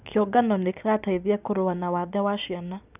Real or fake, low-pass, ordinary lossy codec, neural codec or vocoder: real; 3.6 kHz; none; none